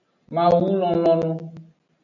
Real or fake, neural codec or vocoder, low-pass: real; none; 7.2 kHz